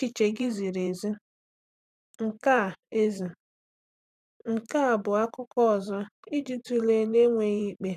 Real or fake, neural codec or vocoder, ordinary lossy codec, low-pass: fake; vocoder, 44.1 kHz, 128 mel bands every 512 samples, BigVGAN v2; none; 14.4 kHz